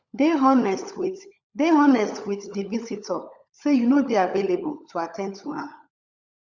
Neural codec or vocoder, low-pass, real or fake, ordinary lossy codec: codec, 16 kHz, 16 kbps, FunCodec, trained on LibriTTS, 50 frames a second; 7.2 kHz; fake; Opus, 64 kbps